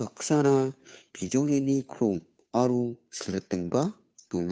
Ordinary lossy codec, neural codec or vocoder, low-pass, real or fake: none; codec, 16 kHz, 2 kbps, FunCodec, trained on Chinese and English, 25 frames a second; none; fake